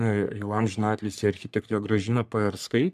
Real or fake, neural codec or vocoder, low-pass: fake; codec, 44.1 kHz, 3.4 kbps, Pupu-Codec; 14.4 kHz